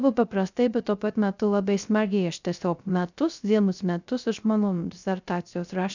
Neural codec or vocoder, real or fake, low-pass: codec, 16 kHz, 0.3 kbps, FocalCodec; fake; 7.2 kHz